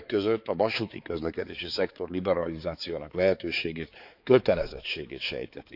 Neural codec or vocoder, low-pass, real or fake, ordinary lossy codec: codec, 16 kHz, 4 kbps, X-Codec, HuBERT features, trained on balanced general audio; 5.4 kHz; fake; none